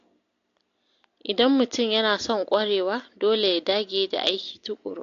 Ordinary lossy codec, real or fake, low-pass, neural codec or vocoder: AAC, 48 kbps; real; 7.2 kHz; none